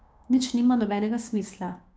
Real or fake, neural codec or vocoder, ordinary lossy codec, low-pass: fake; codec, 16 kHz, 6 kbps, DAC; none; none